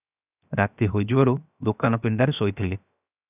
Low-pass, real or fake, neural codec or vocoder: 3.6 kHz; fake; codec, 16 kHz, 0.7 kbps, FocalCodec